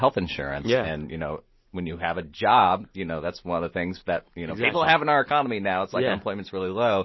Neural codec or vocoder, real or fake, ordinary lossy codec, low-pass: none; real; MP3, 24 kbps; 7.2 kHz